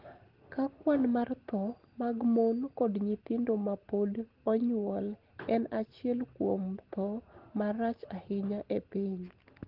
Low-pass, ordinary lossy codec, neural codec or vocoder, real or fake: 5.4 kHz; Opus, 16 kbps; none; real